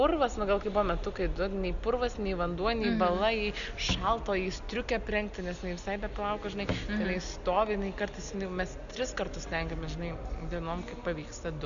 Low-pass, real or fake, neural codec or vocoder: 7.2 kHz; real; none